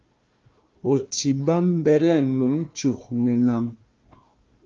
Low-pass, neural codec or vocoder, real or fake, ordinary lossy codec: 7.2 kHz; codec, 16 kHz, 1 kbps, FunCodec, trained on Chinese and English, 50 frames a second; fake; Opus, 32 kbps